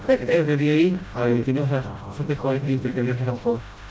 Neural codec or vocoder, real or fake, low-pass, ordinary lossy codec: codec, 16 kHz, 0.5 kbps, FreqCodec, smaller model; fake; none; none